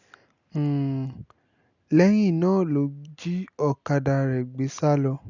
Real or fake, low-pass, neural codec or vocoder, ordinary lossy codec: real; 7.2 kHz; none; none